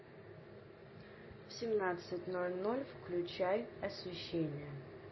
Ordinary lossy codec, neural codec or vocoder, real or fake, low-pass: MP3, 24 kbps; none; real; 7.2 kHz